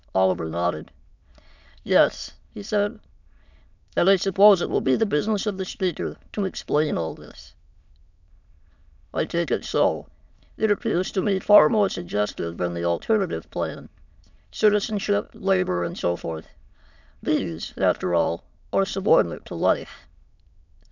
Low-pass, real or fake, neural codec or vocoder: 7.2 kHz; fake; autoencoder, 22.05 kHz, a latent of 192 numbers a frame, VITS, trained on many speakers